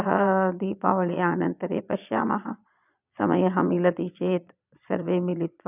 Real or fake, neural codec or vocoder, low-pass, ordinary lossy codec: fake; vocoder, 22.05 kHz, 80 mel bands, Vocos; 3.6 kHz; none